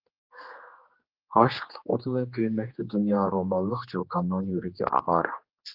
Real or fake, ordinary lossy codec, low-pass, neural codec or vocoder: fake; Opus, 32 kbps; 5.4 kHz; codec, 16 kHz, 2 kbps, X-Codec, HuBERT features, trained on general audio